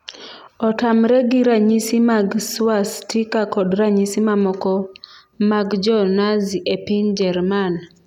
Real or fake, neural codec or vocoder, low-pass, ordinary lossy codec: real; none; 19.8 kHz; none